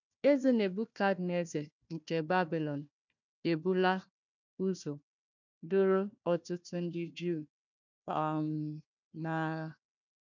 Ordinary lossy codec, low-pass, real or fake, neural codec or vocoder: none; 7.2 kHz; fake; codec, 16 kHz, 1 kbps, FunCodec, trained on Chinese and English, 50 frames a second